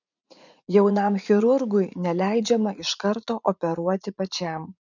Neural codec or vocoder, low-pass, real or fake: vocoder, 44.1 kHz, 80 mel bands, Vocos; 7.2 kHz; fake